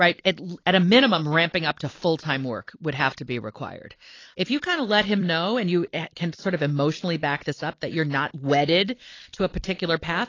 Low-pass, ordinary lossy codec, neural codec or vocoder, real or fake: 7.2 kHz; AAC, 32 kbps; vocoder, 44.1 kHz, 128 mel bands every 256 samples, BigVGAN v2; fake